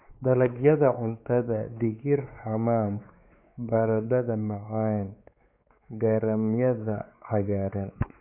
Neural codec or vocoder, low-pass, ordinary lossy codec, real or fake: codec, 16 kHz, 4 kbps, X-Codec, WavLM features, trained on Multilingual LibriSpeech; 3.6 kHz; MP3, 32 kbps; fake